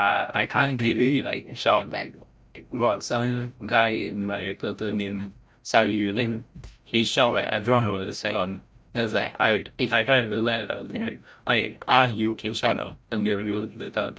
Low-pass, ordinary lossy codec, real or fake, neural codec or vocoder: none; none; fake; codec, 16 kHz, 0.5 kbps, FreqCodec, larger model